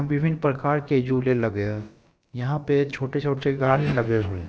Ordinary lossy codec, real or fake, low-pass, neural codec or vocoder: none; fake; none; codec, 16 kHz, about 1 kbps, DyCAST, with the encoder's durations